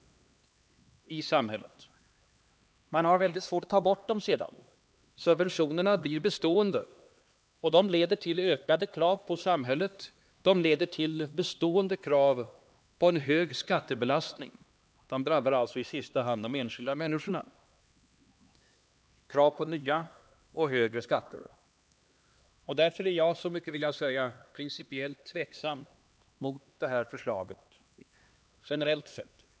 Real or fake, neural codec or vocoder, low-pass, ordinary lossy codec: fake; codec, 16 kHz, 2 kbps, X-Codec, HuBERT features, trained on LibriSpeech; none; none